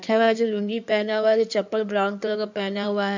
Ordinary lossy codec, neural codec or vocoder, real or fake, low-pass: none; codec, 16 kHz in and 24 kHz out, 2.2 kbps, FireRedTTS-2 codec; fake; 7.2 kHz